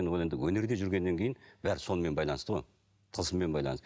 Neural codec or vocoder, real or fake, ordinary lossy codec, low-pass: none; real; none; none